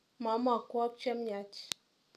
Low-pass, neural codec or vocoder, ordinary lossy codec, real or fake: 14.4 kHz; none; none; real